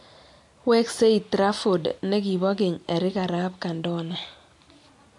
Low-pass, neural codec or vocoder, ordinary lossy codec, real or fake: 10.8 kHz; none; MP3, 64 kbps; real